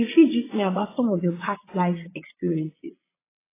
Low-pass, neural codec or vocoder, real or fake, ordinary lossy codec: 3.6 kHz; vocoder, 22.05 kHz, 80 mel bands, Vocos; fake; AAC, 16 kbps